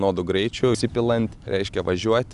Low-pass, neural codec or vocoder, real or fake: 10.8 kHz; none; real